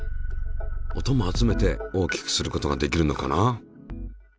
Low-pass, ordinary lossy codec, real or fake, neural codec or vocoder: none; none; real; none